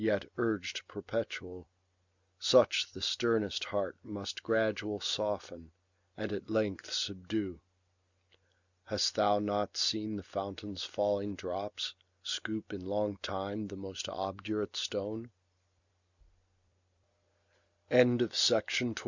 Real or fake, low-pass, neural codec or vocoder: real; 7.2 kHz; none